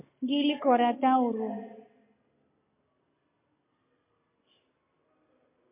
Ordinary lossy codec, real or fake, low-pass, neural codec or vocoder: MP3, 24 kbps; real; 3.6 kHz; none